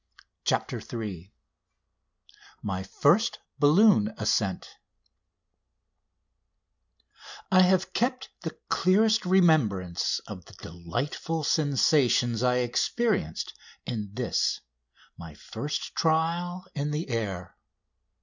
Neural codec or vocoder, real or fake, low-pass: none; real; 7.2 kHz